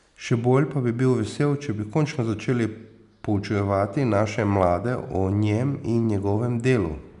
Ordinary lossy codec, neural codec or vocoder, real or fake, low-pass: none; none; real; 10.8 kHz